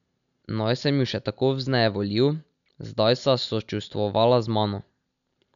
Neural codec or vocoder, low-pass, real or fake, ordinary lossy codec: none; 7.2 kHz; real; none